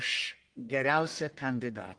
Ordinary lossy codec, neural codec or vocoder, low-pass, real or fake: Opus, 24 kbps; codec, 44.1 kHz, 1.7 kbps, Pupu-Codec; 9.9 kHz; fake